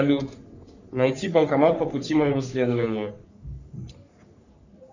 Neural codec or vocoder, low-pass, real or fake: codec, 44.1 kHz, 3.4 kbps, Pupu-Codec; 7.2 kHz; fake